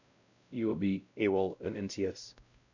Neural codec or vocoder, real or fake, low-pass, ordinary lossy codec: codec, 16 kHz, 0.5 kbps, X-Codec, WavLM features, trained on Multilingual LibriSpeech; fake; 7.2 kHz; none